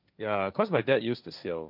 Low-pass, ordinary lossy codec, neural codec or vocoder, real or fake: 5.4 kHz; none; codec, 16 kHz, 1.1 kbps, Voila-Tokenizer; fake